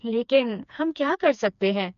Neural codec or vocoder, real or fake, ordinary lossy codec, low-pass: codec, 16 kHz, 2 kbps, FreqCodec, smaller model; fake; none; 7.2 kHz